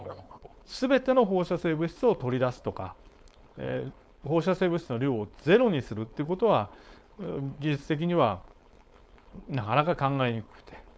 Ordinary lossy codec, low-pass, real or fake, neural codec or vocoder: none; none; fake; codec, 16 kHz, 4.8 kbps, FACodec